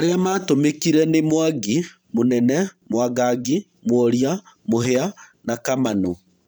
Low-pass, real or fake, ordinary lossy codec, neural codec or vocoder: none; fake; none; vocoder, 44.1 kHz, 128 mel bands every 512 samples, BigVGAN v2